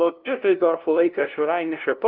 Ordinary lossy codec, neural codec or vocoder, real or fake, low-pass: Opus, 32 kbps; codec, 16 kHz, 0.5 kbps, FunCodec, trained on LibriTTS, 25 frames a second; fake; 5.4 kHz